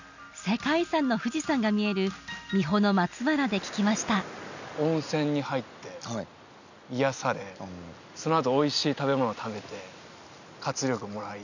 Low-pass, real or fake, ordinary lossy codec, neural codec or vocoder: 7.2 kHz; real; none; none